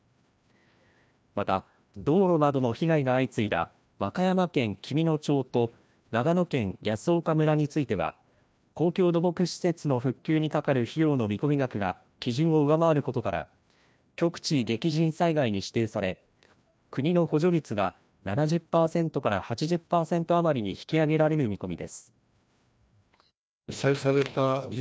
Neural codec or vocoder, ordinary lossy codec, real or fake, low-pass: codec, 16 kHz, 1 kbps, FreqCodec, larger model; none; fake; none